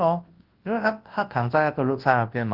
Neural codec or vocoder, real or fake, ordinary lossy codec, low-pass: codec, 24 kHz, 0.9 kbps, WavTokenizer, large speech release; fake; Opus, 16 kbps; 5.4 kHz